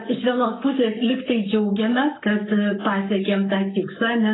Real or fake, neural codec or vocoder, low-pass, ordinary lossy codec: fake; codec, 44.1 kHz, 7.8 kbps, Pupu-Codec; 7.2 kHz; AAC, 16 kbps